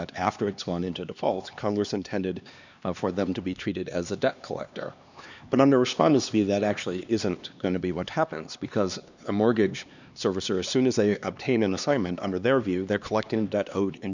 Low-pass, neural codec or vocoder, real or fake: 7.2 kHz; codec, 16 kHz, 2 kbps, X-Codec, HuBERT features, trained on LibriSpeech; fake